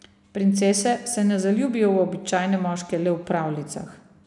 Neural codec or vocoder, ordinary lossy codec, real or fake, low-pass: none; none; real; 10.8 kHz